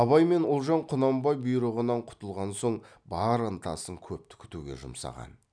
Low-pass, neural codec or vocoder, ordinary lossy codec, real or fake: 9.9 kHz; none; none; real